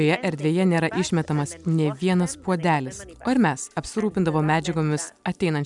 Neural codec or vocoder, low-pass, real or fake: none; 10.8 kHz; real